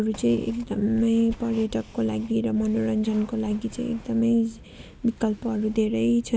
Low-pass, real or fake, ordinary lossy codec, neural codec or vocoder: none; real; none; none